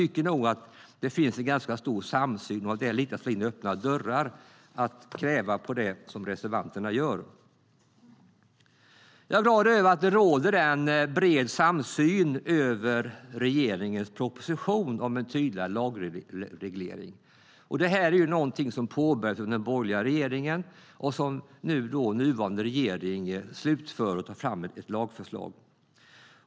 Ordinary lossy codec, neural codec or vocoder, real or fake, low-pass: none; none; real; none